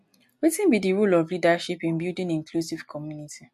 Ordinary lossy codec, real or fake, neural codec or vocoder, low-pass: MP3, 64 kbps; real; none; 14.4 kHz